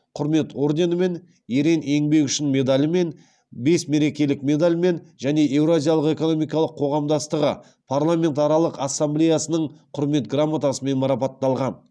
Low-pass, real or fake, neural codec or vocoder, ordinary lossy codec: none; real; none; none